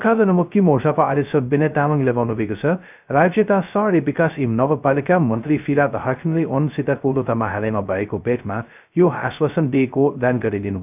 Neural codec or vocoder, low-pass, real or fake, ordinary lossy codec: codec, 16 kHz, 0.2 kbps, FocalCodec; 3.6 kHz; fake; none